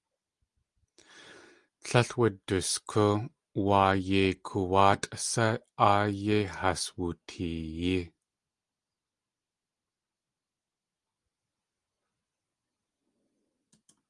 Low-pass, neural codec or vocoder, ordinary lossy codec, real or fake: 10.8 kHz; none; Opus, 32 kbps; real